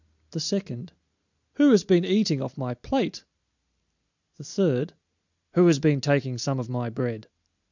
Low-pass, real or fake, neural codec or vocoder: 7.2 kHz; real; none